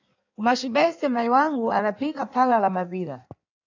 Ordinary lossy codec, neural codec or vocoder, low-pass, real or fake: AAC, 48 kbps; codec, 16 kHz in and 24 kHz out, 1.1 kbps, FireRedTTS-2 codec; 7.2 kHz; fake